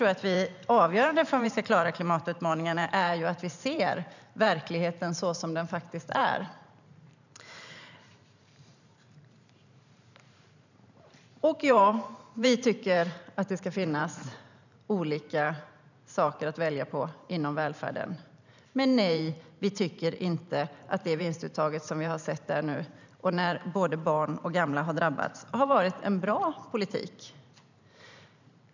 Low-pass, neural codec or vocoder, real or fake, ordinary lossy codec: 7.2 kHz; vocoder, 44.1 kHz, 128 mel bands every 512 samples, BigVGAN v2; fake; none